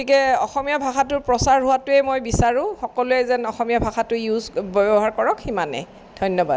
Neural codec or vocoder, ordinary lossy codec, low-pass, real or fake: none; none; none; real